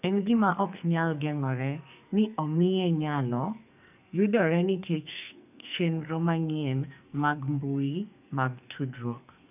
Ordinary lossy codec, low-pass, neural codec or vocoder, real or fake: none; 3.6 kHz; codec, 44.1 kHz, 2.6 kbps, SNAC; fake